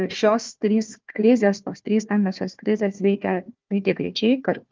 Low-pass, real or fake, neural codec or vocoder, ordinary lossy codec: 7.2 kHz; fake; codec, 16 kHz, 1 kbps, FunCodec, trained on Chinese and English, 50 frames a second; Opus, 24 kbps